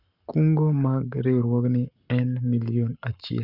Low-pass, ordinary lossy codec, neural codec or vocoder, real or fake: 5.4 kHz; Opus, 64 kbps; codec, 44.1 kHz, 7.8 kbps, Pupu-Codec; fake